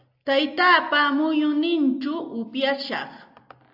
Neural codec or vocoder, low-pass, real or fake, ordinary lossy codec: none; 5.4 kHz; real; AAC, 48 kbps